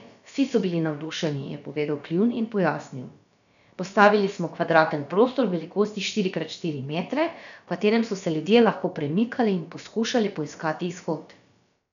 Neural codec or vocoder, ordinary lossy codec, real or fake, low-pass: codec, 16 kHz, about 1 kbps, DyCAST, with the encoder's durations; none; fake; 7.2 kHz